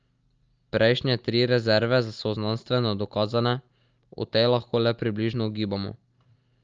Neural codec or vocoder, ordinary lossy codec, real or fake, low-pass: none; Opus, 24 kbps; real; 7.2 kHz